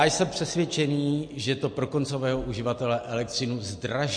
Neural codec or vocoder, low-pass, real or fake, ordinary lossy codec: none; 9.9 kHz; real; MP3, 48 kbps